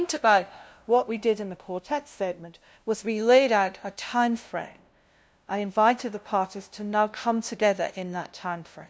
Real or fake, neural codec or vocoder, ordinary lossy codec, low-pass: fake; codec, 16 kHz, 0.5 kbps, FunCodec, trained on LibriTTS, 25 frames a second; none; none